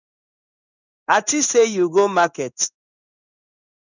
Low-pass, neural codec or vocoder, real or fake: 7.2 kHz; codec, 16 kHz in and 24 kHz out, 1 kbps, XY-Tokenizer; fake